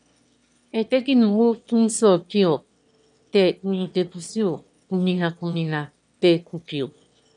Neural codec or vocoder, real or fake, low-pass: autoencoder, 22.05 kHz, a latent of 192 numbers a frame, VITS, trained on one speaker; fake; 9.9 kHz